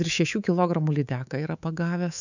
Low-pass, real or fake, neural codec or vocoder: 7.2 kHz; fake; codec, 24 kHz, 3.1 kbps, DualCodec